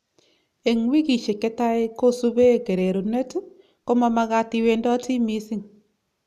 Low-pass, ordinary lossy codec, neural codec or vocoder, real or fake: 14.4 kHz; none; none; real